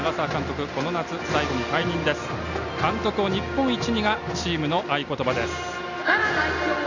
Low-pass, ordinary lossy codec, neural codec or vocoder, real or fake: 7.2 kHz; none; none; real